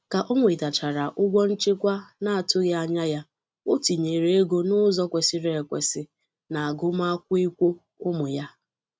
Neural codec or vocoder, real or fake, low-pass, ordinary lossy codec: none; real; none; none